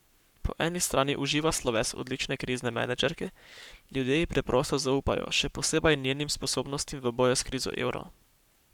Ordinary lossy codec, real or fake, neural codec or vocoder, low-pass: none; fake; codec, 44.1 kHz, 7.8 kbps, Pupu-Codec; 19.8 kHz